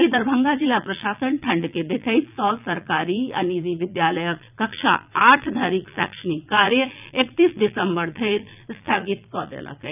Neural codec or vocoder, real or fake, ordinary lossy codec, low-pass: vocoder, 22.05 kHz, 80 mel bands, Vocos; fake; none; 3.6 kHz